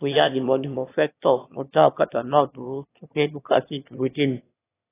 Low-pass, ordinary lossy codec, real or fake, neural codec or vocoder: 3.6 kHz; AAC, 16 kbps; fake; autoencoder, 22.05 kHz, a latent of 192 numbers a frame, VITS, trained on one speaker